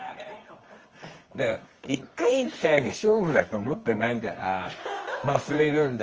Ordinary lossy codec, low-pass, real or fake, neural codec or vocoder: Opus, 24 kbps; 7.2 kHz; fake; codec, 24 kHz, 0.9 kbps, WavTokenizer, medium music audio release